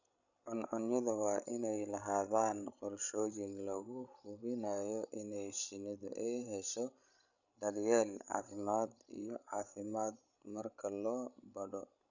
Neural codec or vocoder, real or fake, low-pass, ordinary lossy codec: codec, 16 kHz, 16 kbps, FreqCodec, larger model; fake; 7.2 kHz; none